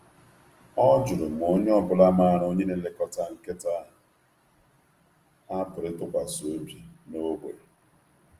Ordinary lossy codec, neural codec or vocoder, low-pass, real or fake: Opus, 24 kbps; none; 14.4 kHz; real